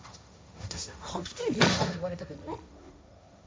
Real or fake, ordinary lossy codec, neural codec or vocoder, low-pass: fake; none; codec, 16 kHz, 1.1 kbps, Voila-Tokenizer; none